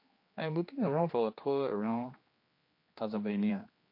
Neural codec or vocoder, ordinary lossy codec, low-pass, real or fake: codec, 16 kHz, 2 kbps, X-Codec, HuBERT features, trained on general audio; MP3, 32 kbps; 5.4 kHz; fake